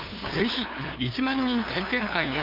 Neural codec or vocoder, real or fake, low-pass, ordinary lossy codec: codec, 16 kHz, 2 kbps, FunCodec, trained on LibriTTS, 25 frames a second; fake; 5.4 kHz; MP3, 48 kbps